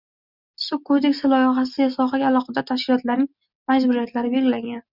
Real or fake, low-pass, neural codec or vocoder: real; 5.4 kHz; none